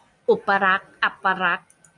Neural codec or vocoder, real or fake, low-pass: none; real; 10.8 kHz